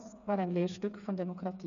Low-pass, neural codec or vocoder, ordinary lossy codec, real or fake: 7.2 kHz; codec, 16 kHz, 4 kbps, FreqCodec, smaller model; none; fake